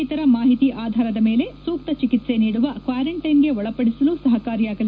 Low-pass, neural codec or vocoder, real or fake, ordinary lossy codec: none; none; real; none